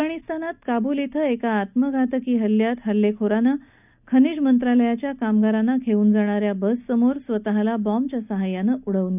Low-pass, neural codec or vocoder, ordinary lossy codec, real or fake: 3.6 kHz; none; none; real